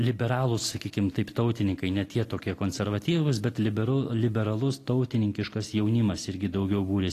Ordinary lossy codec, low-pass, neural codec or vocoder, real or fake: AAC, 48 kbps; 14.4 kHz; none; real